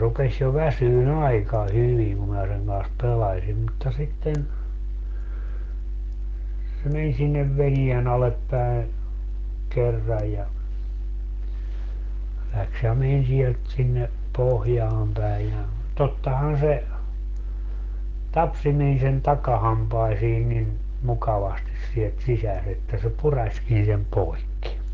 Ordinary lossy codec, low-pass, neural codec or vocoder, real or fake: Opus, 16 kbps; 7.2 kHz; none; real